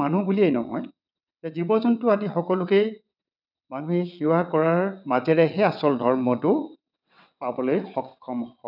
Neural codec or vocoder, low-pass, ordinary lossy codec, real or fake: vocoder, 22.05 kHz, 80 mel bands, WaveNeXt; 5.4 kHz; none; fake